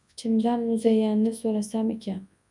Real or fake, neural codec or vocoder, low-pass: fake; codec, 24 kHz, 0.9 kbps, WavTokenizer, large speech release; 10.8 kHz